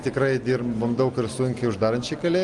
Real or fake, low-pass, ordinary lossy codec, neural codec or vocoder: real; 10.8 kHz; Opus, 24 kbps; none